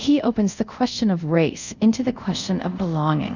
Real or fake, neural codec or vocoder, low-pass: fake; codec, 24 kHz, 0.5 kbps, DualCodec; 7.2 kHz